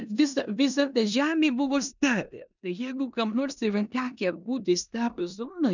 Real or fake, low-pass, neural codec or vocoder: fake; 7.2 kHz; codec, 16 kHz in and 24 kHz out, 0.9 kbps, LongCat-Audio-Codec, four codebook decoder